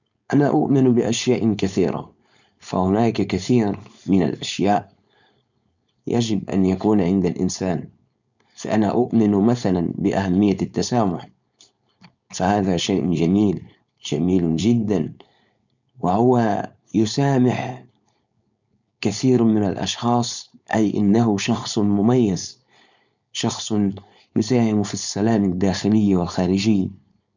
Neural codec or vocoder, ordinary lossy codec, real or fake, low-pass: codec, 16 kHz, 4.8 kbps, FACodec; none; fake; 7.2 kHz